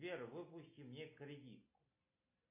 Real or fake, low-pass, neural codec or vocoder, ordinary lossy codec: real; 3.6 kHz; none; MP3, 24 kbps